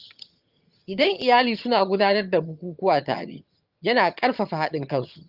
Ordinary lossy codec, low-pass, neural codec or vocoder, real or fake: Opus, 24 kbps; 5.4 kHz; vocoder, 22.05 kHz, 80 mel bands, HiFi-GAN; fake